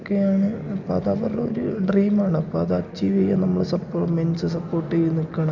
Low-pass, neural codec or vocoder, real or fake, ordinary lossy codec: 7.2 kHz; none; real; none